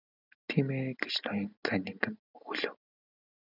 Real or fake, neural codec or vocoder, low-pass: real; none; 5.4 kHz